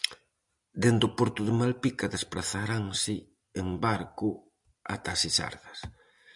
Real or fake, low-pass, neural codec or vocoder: real; 10.8 kHz; none